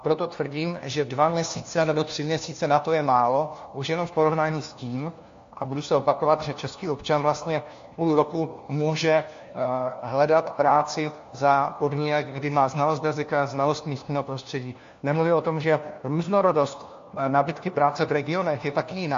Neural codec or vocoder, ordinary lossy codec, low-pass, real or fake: codec, 16 kHz, 1 kbps, FunCodec, trained on LibriTTS, 50 frames a second; AAC, 48 kbps; 7.2 kHz; fake